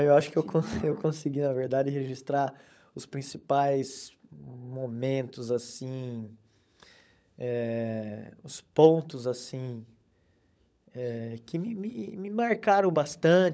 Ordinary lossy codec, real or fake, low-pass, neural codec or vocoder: none; fake; none; codec, 16 kHz, 16 kbps, FunCodec, trained on LibriTTS, 50 frames a second